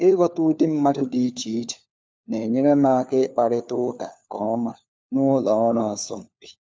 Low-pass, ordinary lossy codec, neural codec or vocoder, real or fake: none; none; codec, 16 kHz, 4 kbps, FunCodec, trained on LibriTTS, 50 frames a second; fake